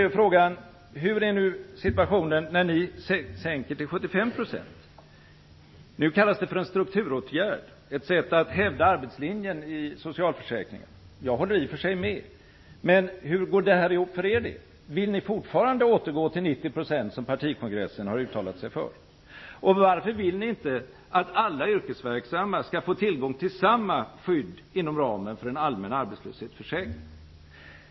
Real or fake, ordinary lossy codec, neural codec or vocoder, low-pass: real; MP3, 24 kbps; none; 7.2 kHz